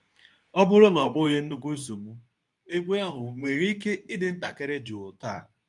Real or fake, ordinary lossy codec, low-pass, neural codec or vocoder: fake; none; 10.8 kHz; codec, 24 kHz, 0.9 kbps, WavTokenizer, medium speech release version 2